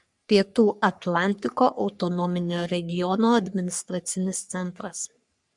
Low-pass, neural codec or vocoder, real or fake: 10.8 kHz; codec, 44.1 kHz, 3.4 kbps, Pupu-Codec; fake